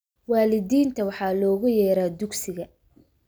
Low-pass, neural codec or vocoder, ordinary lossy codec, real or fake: none; none; none; real